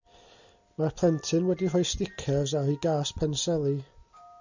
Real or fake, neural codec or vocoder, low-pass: real; none; 7.2 kHz